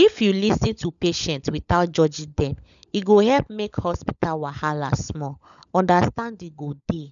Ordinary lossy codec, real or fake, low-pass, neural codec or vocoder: none; fake; 7.2 kHz; codec, 16 kHz, 16 kbps, FunCodec, trained on LibriTTS, 50 frames a second